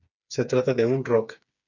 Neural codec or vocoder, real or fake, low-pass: codec, 16 kHz, 4 kbps, FreqCodec, smaller model; fake; 7.2 kHz